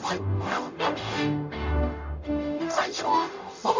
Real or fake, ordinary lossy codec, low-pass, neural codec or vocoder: fake; MP3, 64 kbps; 7.2 kHz; codec, 44.1 kHz, 0.9 kbps, DAC